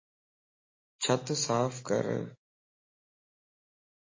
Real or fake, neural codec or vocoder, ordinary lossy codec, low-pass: real; none; MP3, 32 kbps; 7.2 kHz